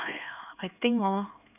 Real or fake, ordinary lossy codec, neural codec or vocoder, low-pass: fake; none; codec, 16 kHz, 4 kbps, X-Codec, HuBERT features, trained on LibriSpeech; 3.6 kHz